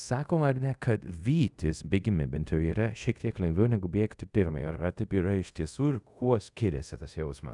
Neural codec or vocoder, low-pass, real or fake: codec, 24 kHz, 0.5 kbps, DualCodec; 10.8 kHz; fake